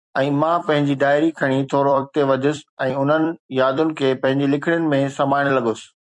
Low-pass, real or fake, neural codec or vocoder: 10.8 kHz; fake; vocoder, 44.1 kHz, 128 mel bands every 256 samples, BigVGAN v2